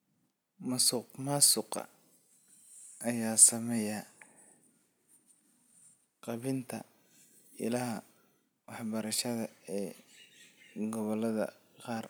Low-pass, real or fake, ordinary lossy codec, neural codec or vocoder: none; real; none; none